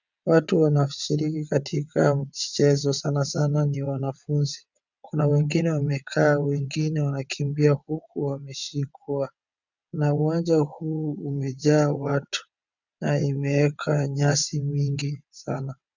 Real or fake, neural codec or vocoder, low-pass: fake; vocoder, 22.05 kHz, 80 mel bands, WaveNeXt; 7.2 kHz